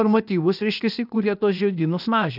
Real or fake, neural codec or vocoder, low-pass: fake; codec, 16 kHz, 0.8 kbps, ZipCodec; 5.4 kHz